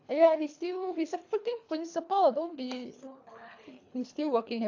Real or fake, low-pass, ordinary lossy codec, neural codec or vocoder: fake; 7.2 kHz; AAC, 48 kbps; codec, 24 kHz, 3 kbps, HILCodec